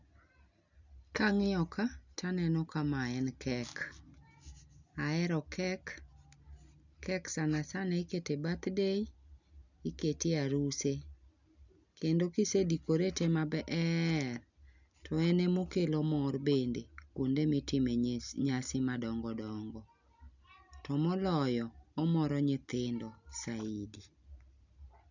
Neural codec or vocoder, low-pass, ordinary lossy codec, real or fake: none; 7.2 kHz; none; real